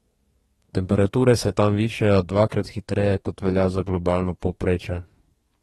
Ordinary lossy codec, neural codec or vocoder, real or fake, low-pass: AAC, 32 kbps; codec, 32 kHz, 1.9 kbps, SNAC; fake; 14.4 kHz